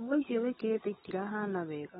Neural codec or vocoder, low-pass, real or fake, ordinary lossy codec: codec, 16 kHz, 16 kbps, FunCodec, trained on LibriTTS, 50 frames a second; 7.2 kHz; fake; AAC, 16 kbps